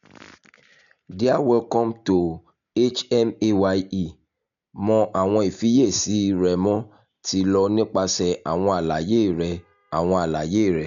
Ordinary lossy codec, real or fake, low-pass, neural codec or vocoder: none; real; 7.2 kHz; none